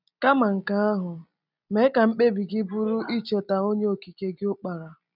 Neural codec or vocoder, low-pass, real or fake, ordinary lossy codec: none; 5.4 kHz; real; none